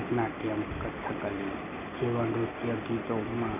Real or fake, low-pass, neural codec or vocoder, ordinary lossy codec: real; 3.6 kHz; none; none